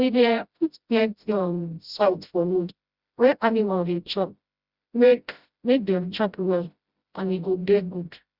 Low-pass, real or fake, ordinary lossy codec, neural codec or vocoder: 5.4 kHz; fake; Opus, 64 kbps; codec, 16 kHz, 0.5 kbps, FreqCodec, smaller model